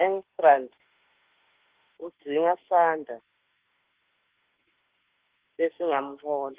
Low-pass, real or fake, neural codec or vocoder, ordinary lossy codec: 3.6 kHz; fake; autoencoder, 48 kHz, 32 numbers a frame, DAC-VAE, trained on Japanese speech; Opus, 16 kbps